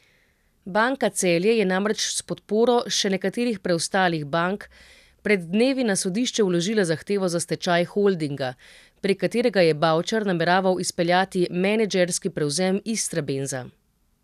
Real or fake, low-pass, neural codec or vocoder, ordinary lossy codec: real; 14.4 kHz; none; none